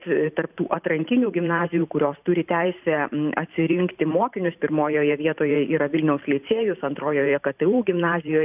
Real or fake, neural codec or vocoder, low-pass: fake; vocoder, 44.1 kHz, 128 mel bands every 512 samples, BigVGAN v2; 3.6 kHz